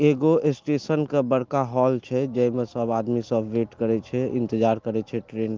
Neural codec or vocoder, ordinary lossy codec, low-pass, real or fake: none; Opus, 32 kbps; 7.2 kHz; real